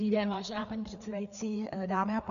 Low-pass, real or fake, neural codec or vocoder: 7.2 kHz; fake; codec, 16 kHz, 4 kbps, FreqCodec, larger model